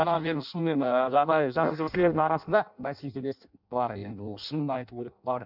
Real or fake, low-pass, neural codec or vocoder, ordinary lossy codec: fake; 5.4 kHz; codec, 16 kHz in and 24 kHz out, 0.6 kbps, FireRedTTS-2 codec; none